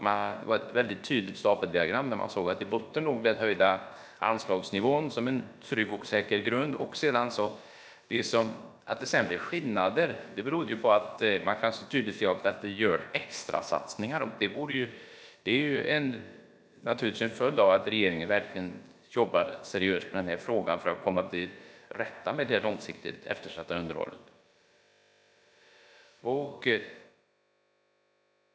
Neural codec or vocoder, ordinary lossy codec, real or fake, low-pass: codec, 16 kHz, about 1 kbps, DyCAST, with the encoder's durations; none; fake; none